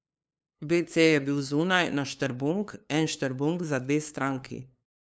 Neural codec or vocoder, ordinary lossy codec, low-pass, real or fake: codec, 16 kHz, 2 kbps, FunCodec, trained on LibriTTS, 25 frames a second; none; none; fake